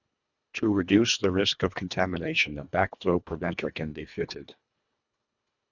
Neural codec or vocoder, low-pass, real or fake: codec, 24 kHz, 1.5 kbps, HILCodec; 7.2 kHz; fake